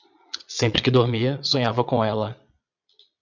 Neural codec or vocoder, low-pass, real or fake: vocoder, 22.05 kHz, 80 mel bands, Vocos; 7.2 kHz; fake